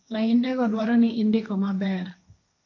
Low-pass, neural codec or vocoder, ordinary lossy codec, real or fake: 7.2 kHz; codec, 16 kHz, 1.1 kbps, Voila-Tokenizer; AAC, 48 kbps; fake